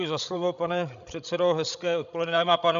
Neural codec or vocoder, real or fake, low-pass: codec, 16 kHz, 16 kbps, FreqCodec, larger model; fake; 7.2 kHz